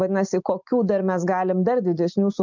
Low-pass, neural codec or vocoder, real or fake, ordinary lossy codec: 7.2 kHz; none; real; MP3, 64 kbps